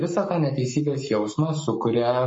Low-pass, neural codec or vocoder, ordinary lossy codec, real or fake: 10.8 kHz; codec, 44.1 kHz, 7.8 kbps, Pupu-Codec; MP3, 32 kbps; fake